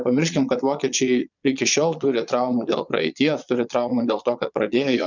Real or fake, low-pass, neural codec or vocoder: fake; 7.2 kHz; vocoder, 22.05 kHz, 80 mel bands, Vocos